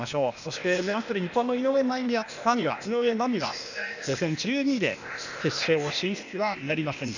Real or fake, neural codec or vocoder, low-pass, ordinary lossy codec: fake; codec, 16 kHz, 0.8 kbps, ZipCodec; 7.2 kHz; none